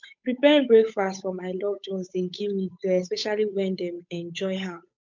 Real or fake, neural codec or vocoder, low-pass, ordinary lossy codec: fake; codec, 16 kHz, 8 kbps, FunCodec, trained on Chinese and English, 25 frames a second; 7.2 kHz; MP3, 64 kbps